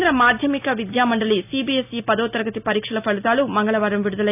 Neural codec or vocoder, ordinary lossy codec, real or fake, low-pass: none; none; real; 3.6 kHz